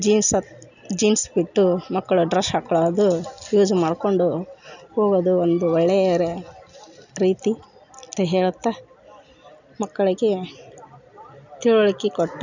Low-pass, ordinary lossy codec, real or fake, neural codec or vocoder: 7.2 kHz; none; real; none